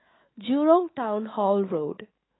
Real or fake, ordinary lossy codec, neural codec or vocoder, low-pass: fake; AAC, 16 kbps; autoencoder, 48 kHz, 128 numbers a frame, DAC-VAE, trained on Japanese speech; 7.2 kHz